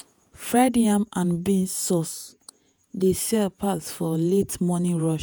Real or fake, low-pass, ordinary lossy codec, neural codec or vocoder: fake; none; none; vocoder, 48 kHz, 128 mel bands, Vocos